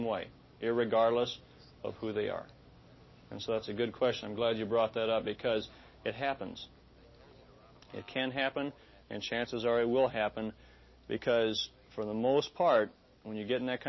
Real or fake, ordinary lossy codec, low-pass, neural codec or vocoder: real; MP3, 24 kbps; 7.2 kHz; none